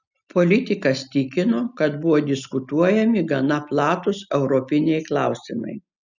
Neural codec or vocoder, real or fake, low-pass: none; real; 7.2 kHz